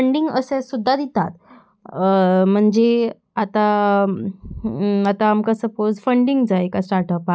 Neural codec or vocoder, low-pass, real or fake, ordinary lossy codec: none; none; real; none